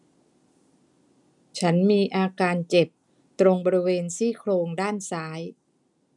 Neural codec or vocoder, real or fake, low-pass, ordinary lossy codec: none; real; 10.8 kHz; none